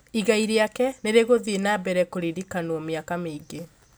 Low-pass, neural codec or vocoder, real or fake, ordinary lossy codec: none; none; real; none